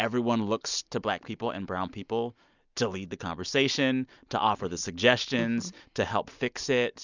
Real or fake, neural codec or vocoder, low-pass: real; none; 7.2 kHz